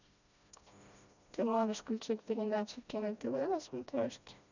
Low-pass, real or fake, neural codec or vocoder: 7.2 kHz; fake; codec, 16 kHz, 1 kbps, FreqCodec, smaller model